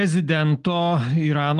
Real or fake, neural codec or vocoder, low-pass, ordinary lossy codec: real; none; 10.8 kHz; Opus, 24 kbps